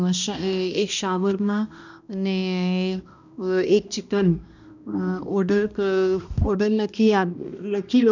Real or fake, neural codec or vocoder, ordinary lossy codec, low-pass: fake; codec, 16 kHz, 1 kbps, X-Codec, HuBERT features, trained on balanced general audio; none; 7.2 kHz